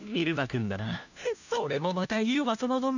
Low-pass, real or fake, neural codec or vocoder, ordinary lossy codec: 7.2 kHz; fake; codec, 16 kHz, 1 kbps, FunCodec, trained on LibriTTS, 50 frames a second; none